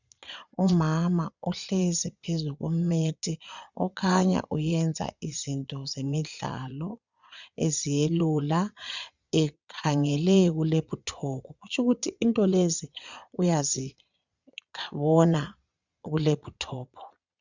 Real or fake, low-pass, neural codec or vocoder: fake; 7.2 kHz; vocoder, 22.05 kHz, 80 mel bands, Vocos